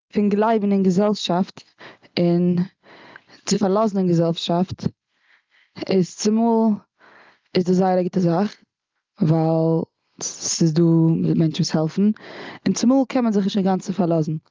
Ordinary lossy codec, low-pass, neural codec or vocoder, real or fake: Opus, 32 kbps; 7.2 kHz; none; real